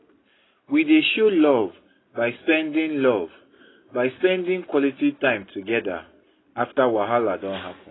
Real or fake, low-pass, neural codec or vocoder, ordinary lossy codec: real; 7.2 kHz; none; AAC, 16 kbps